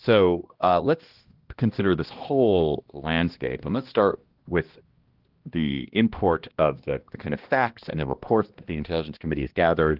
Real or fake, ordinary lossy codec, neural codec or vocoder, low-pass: fake; Opus, 16 kbps; codec, 16 kHz, 1 kbps, X-Codec, HuBERT features, trained on balanced general audio; 5.4 kHz